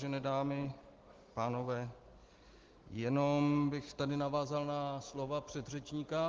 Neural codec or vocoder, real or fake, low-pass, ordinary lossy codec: none; real; 7.2 kHz; Opus, 16 kbps